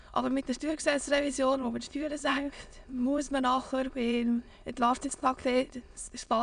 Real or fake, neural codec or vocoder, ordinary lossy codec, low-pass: fake; autoencoder, 22.05 kHz, a latent of 192 numbers a frame, VITS, trained on many speakers; none; 9.9 kHz